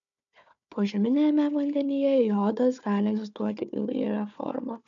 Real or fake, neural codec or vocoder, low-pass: fake; codec, 16 kHz, 4 kbps, FunCodec, trained on Chinese and English, 50 frames a second; 7.2 kHz